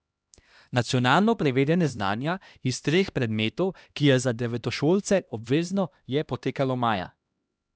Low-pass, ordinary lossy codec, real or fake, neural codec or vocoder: none; none; fake; codec, 16 kHz, 1 kbps, X-Codec, HuBERT features, trained on LibriSpeech